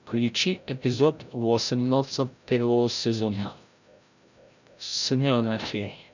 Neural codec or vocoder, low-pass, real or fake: codec, 16 kHz, 0.5 kbps, FreqCodec, larger model; 7.2 kHz; fake